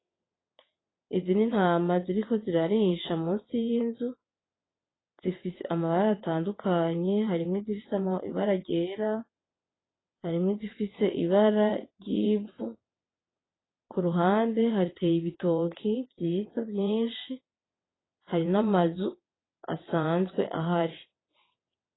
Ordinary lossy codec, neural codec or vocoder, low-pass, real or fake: AAC, 16 kbps; vocoder, 22.05 kHz, 80 mel bands, WaveNeXt; 7.2 kHz; fake